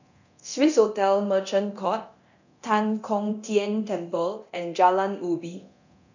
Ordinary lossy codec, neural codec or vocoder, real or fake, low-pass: none; codec, 24 kHz, 0.9 kbps, DualCodec; fake; 7.2 kHz